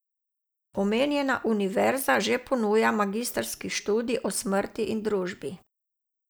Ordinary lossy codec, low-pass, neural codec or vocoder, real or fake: none; none; none; real